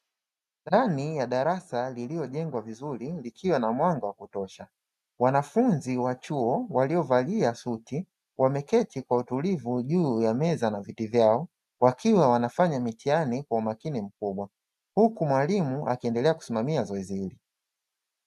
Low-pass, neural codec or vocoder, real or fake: 14.4 kHz; none; real